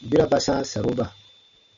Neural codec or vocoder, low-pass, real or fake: none; 7.2 kHz; real